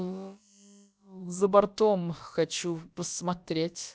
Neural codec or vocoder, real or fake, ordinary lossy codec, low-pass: codec, 16 kHz, about 1 kbps, DyCAST, with the encoder's durations; fake; none; none